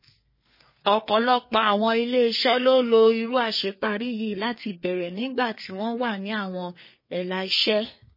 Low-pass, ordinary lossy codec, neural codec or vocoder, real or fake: 5.4 kHz; MP3, 24 kbps; codec, 32 kHz, 1.9 kbps, SNAC; fake